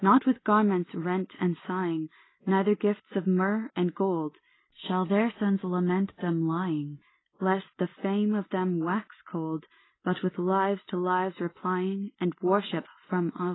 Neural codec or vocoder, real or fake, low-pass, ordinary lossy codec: none; real; 7.2 kHz; AAC, 16 kbps